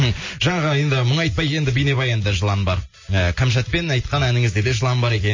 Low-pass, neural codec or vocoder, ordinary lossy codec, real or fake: 7.2 kHz; none; MP3, 32 kbps; real